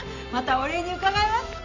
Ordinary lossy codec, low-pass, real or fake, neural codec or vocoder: none; 7.2 kHz; real; none